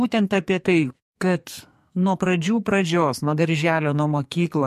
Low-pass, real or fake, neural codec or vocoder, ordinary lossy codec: 14.4 kHz; fake; codec, 44.1 kHz, 2.6 kbps, SNAC; MP3, 64 kbps